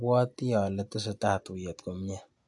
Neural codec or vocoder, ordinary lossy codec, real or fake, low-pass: none; AAC, 64 kbps; real; 10.8 kHz